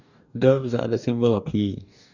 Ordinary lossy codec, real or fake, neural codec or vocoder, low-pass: none; fake; codec, 44.1 kHz, 2.6 kbps, DAC; 7.2 kHz